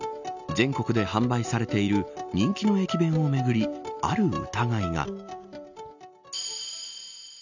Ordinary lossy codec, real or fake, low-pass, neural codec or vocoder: none; real; 7.2 kHz; none